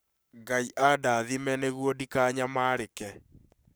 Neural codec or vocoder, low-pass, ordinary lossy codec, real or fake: codec, 44.1 kHz, 7.8 kbps, Pupu-Codec; none; none; fake